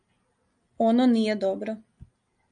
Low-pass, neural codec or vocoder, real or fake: 9.9 kHz; none; real